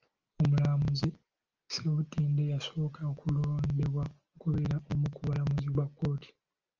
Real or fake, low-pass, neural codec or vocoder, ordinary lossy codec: real; 7.2 kHz; none; Opus, 32 kbps